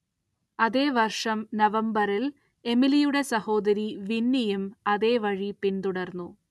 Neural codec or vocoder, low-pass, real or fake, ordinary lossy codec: none; none; real; none